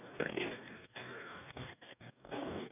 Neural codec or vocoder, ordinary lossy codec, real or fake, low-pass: codec, 44.1 kHz, 2.6 kbps, DAC; none; fake; 3.6 kHz